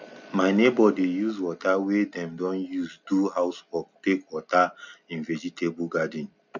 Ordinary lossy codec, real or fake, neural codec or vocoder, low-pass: none; real; none; 7.2 kHz